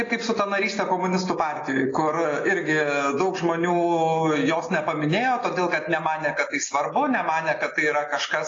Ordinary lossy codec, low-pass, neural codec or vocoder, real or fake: AAC, 32 kbps; 7.2 kHz; none; real